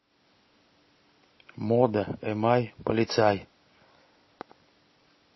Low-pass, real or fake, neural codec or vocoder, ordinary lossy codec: 7.2 kHz; real; none; MP3, 24 kbps